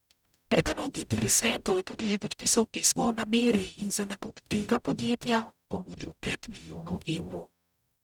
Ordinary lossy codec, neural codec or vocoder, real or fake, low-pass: none; codec, 44.1 kHz, 0.9 kbps, DAC; fake; 19.8 kHz